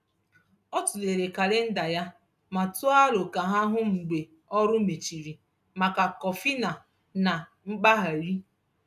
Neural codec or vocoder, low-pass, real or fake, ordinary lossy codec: none; 14.4 kHz; real; none